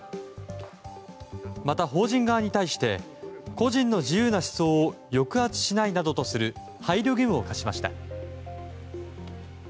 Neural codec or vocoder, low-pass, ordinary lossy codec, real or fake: none; none; none; real